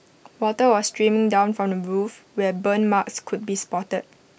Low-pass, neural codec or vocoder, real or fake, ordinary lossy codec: none; none; real; none